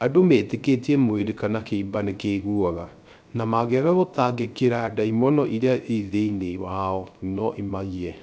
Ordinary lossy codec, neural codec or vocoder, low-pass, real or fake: none; codec, 16 kHz, 0.3 kbps, FocalCodec; none; fake